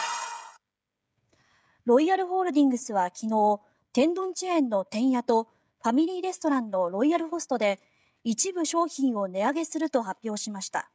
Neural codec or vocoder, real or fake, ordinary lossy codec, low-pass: codec, 16 kHz, 16 kbps, FreqCodec, smaller model; fake; none; none